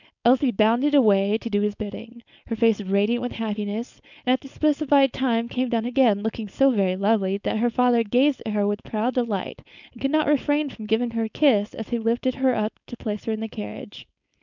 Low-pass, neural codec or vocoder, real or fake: 7.2 kHz; codec, 16 kHz, 4.8 kbps, FACodec; fake